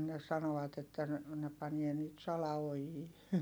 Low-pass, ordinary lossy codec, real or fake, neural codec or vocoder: none; none; real; none